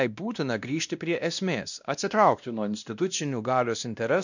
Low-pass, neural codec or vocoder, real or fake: 7.2 kHz; codec, 16 kHz, 1 kbps, X-Codec, WavLM features, trained on Multilingual LibriSpeech; fake